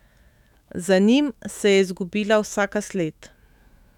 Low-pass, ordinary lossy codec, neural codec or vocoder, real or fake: 19.8 kHz; none; autoencoder, 48 kHz, 128 numbers a frame, DAC-VAE, trained on Japanese speech; fake